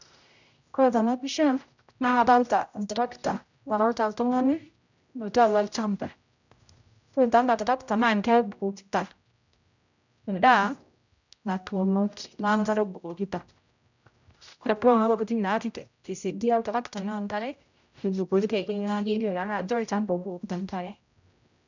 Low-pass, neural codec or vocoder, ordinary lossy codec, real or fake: 7.2 kHz; codec, 16 kHz, 0.5 kbps, X-Codec, HuBERT features, trained on general audio; none; fake